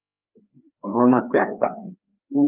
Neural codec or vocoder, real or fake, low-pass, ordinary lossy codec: codec, 16 kHz, 2 kbps, FreqCodec, larger model; fake; 3.6 kHz; Opus, 32 kbps